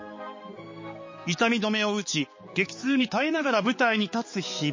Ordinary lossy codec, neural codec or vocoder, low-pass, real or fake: MP3, 32 kbps; codec, 16 kHz, 4 kbps, X-Codec, HuBERT features, trained on balanced general audio; 7.2 kHz; fake